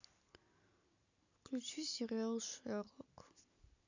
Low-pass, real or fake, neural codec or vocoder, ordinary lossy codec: 7.2 kHz; real; none; none